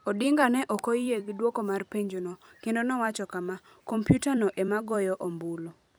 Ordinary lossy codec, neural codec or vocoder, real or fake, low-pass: none; vocoder, 44.1 kHz, 128 mel bands every 256 samples, BigVGAN v2; fake; none